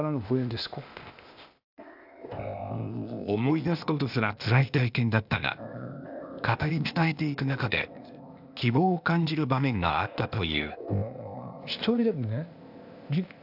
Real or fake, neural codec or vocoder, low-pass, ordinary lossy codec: fake; codec, 16 kHz, 0.8 kbps, ZipCodec; 5.4 kHz; AAC, 48 kbps